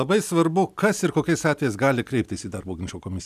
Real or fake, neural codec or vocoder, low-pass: real; none; 14.4 kHz